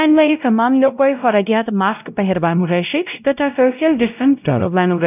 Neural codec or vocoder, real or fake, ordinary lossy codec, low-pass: codec, 16 kHz, 0.5 kbps, X-Codec, WavLM features, trained on Multilingual LibriSpeech; fake; none; 3.6 kHz